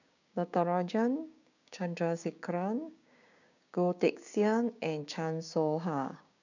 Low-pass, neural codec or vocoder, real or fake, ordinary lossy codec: 7.2 kHz; codec, 16 kHz, 6 kbps, DAC; fake; none